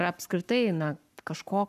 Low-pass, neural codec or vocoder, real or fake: 14.4 kHz; codec, 44.1 kHz, 7.8 kbps, Pupu-Codec; fake